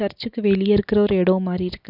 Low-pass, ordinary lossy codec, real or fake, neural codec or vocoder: 5.4 kHz; none; real; none